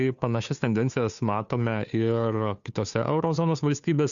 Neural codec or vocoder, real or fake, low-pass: codec, 16 kHz, 2 kbps, FunCodec, trained on Chinese and English, 25 frames a second; fake; 7.2 kHz